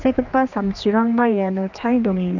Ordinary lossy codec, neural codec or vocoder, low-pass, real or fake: none; codec, 16 kHz, 2 kbps, X-Codec, HuBERT features, trained on general audio; 7.2 kHz; fake